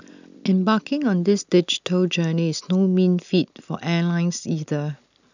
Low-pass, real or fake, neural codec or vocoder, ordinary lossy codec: 7.2 kHz; real; none; none